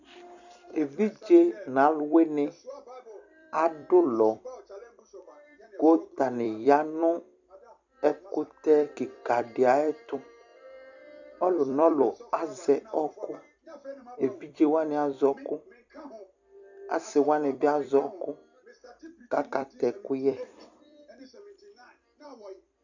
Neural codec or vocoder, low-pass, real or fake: vocoder, 44.1 kHz, 128 mel bands every 256 samples, BigVGAN v2; 7.2 kHz; fake